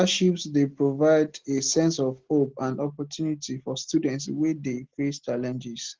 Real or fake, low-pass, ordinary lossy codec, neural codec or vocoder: real; 7.2 kHz; Opus, 16 kbps; none